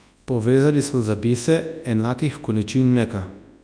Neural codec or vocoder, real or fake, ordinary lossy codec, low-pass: codec, 24 kHz, 0.9 kbps, WavTokenizer, large speech release; fake; none; 9.9 kHz